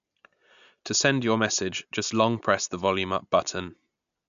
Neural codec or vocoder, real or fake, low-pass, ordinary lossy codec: none; real; 7.2 kHz; MP3, 64 kbps